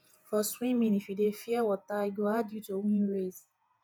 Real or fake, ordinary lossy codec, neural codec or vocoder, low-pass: fake; none; vocoder, 48 kHz, 128 mel bands, Vocos; none